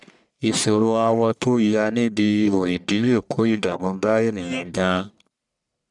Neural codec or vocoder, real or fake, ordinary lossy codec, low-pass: codec, 44.1 kHz, 1.7 kbps, Pupu-Codec; fake; none; 10.8 kHz